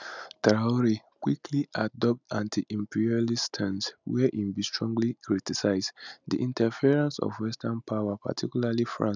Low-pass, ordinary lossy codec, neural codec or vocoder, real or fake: 7.2 kHz; none; none; real